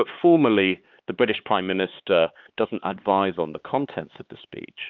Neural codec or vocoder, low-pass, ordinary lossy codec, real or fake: codec, 16 kHz, 4 kbps, X-Codec, HuBERT features, trained on LibriSpeech; 7.2 kHz; Opus, 32 kbps; fake